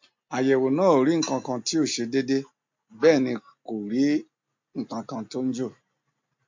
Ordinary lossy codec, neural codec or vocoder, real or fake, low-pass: MP3, 48 kbps; none; real; 7.2 kHz